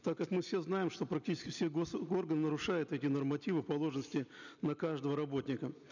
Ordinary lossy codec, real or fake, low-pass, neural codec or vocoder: none; real; 7.2 kHz; none